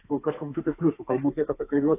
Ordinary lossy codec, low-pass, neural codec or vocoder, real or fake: AAC, 24 kbps; 3.6 kHz; codec, 32 kHz, 1.9 kbps, SNAC; fake